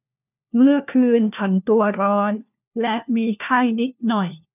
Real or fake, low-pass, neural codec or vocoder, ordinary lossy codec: fake; 3.6 kHz; codec, 16 kHz, 1 kbps, FunCodec, trained on LibriTTS, 50 frames a second; none